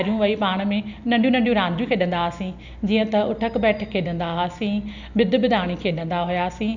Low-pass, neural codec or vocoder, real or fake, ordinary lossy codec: 7.2 kHz; none; real; none